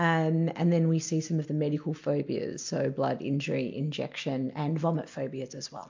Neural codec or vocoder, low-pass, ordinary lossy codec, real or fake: none; 7.2 kHz; MP3, 48 kbps; real